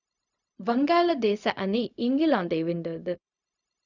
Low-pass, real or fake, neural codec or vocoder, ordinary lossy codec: 7.2 kHz; fake; codec, 16 kHz, 0.4 kbps, LongCat-Audio-Codec; none